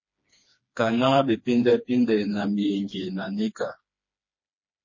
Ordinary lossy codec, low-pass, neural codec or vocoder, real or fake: MP3, 32 kbps; 7.2 kHz; codec, 16 kHz, 2 kbps, FreqCodec, smaller model; fake